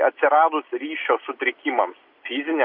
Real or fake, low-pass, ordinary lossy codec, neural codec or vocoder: real; 5.4 kHz; Opus, 64 kbps; none